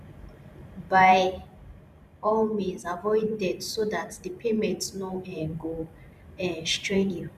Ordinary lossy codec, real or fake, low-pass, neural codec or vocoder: none; fake; 14.4 kHz; vocoder, 44.1 kHz, 128 mel bands every 512 samples, BigVGAN v2